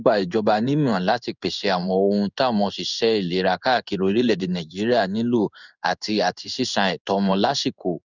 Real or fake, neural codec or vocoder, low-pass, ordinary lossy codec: fake; codec, 16 kHz in and 24 kHz out, 1 kbps, XY-Tokenizer; 7.2 kHz; none